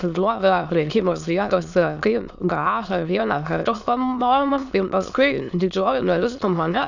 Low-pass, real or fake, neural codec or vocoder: 7.2 kHz; fake; autoencoder, 22.05 kHz, a latent of 192 numbers a frame, VITS, trained on many speakers